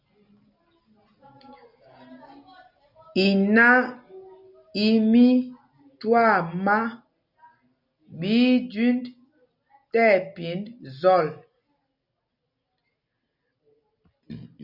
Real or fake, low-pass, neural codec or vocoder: real; 5.4 kHz; none